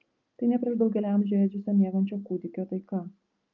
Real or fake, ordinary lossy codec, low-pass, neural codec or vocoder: real; Opus, 24 kbps; 7.2 kHz; none